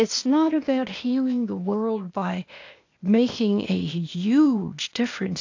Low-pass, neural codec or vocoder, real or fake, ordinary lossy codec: 7.2 kHz; codec, 16 kHz, 0.8 kbps, ZipCodec; fake; AAC, 48 kbps